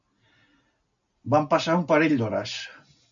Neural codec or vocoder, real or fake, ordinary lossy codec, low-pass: none; real; AAC, 64 kbps; 7.2 kHz